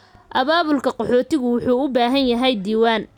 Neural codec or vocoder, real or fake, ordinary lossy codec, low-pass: none; real; none; 19.8 kHz